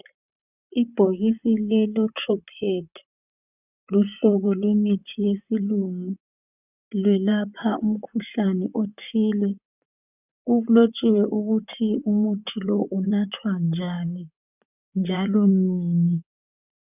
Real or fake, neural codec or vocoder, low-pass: fake; vocoder, 44.1 kHz, 128 mel bands, Pupu-Vocoder; 3.6 kHz